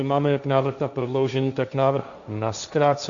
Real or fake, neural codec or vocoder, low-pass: fake; codec, 16 kHz, 1.1 kbps, Voila-Tokenizer; 7.2 kHz